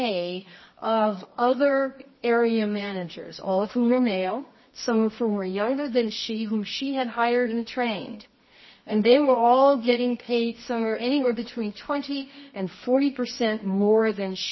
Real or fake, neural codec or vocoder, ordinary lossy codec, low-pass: fake; codec, 24 kHz, 0.9 kbps, WavTokenizer, medium music audio release; MP3, 24 kbps; 7.2 kHz